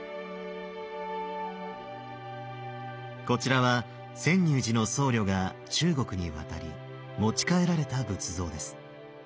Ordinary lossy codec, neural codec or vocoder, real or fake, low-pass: none; none; real; none